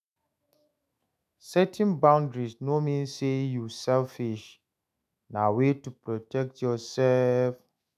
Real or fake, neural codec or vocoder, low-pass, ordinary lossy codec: fake; autoencoder, 48 kHz, 128 numbers a frame, DAC-VAE, trained on Japanese speech; 14.4 kHz; none